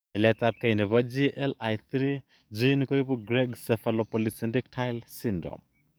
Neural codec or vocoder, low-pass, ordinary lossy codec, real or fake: codec, 44.1 kHz, 7.8 kbps, DAC; none; none; fake